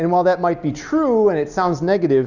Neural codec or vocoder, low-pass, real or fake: none; 7.2 kHz; real